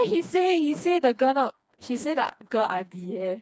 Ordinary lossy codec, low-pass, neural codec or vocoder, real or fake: none; none; codec, 16 kHz, 2 kbps, FreqCodec, smaller model; fake